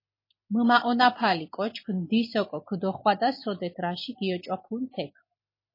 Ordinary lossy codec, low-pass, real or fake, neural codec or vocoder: MP3, 24 kbps; 5.4 kHz; real; none